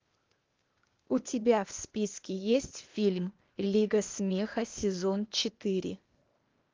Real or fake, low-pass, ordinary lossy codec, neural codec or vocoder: fake; 7.2 kHz; Opus, 32 kbps; codec, 16 kHz, 0.8 kbps, ZipCodec